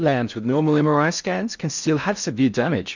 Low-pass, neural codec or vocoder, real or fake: 7.2 kHz; codec, 16 kHz in and 24 kHz out, 0.6 kbps, FocalCodec, streaming, 2048 codes; fake